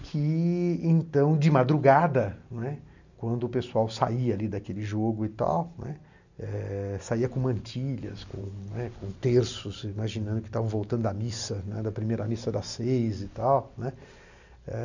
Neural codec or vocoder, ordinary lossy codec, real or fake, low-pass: none; none; real; 7.2 kHz